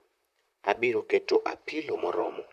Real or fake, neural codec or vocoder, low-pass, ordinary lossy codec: fake; vocoder, 44.1 kHz, 128 mel bands, Pupu-Vocoder; 14.4 kHz; none